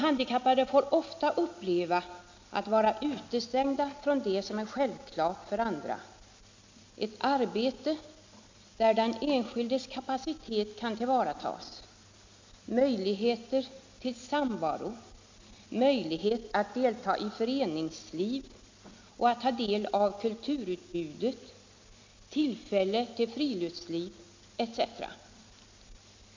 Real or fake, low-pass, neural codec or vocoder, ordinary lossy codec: real; 7.2 kHz; none; MP3, 64 kbps